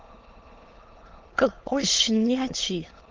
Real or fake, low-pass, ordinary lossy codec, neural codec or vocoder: fake; 7.2 kHz; Opus, 16 kbps; autoencoder, 22.05 kHz, a latent of 192 numbers a frame, VITS, trained on many speakers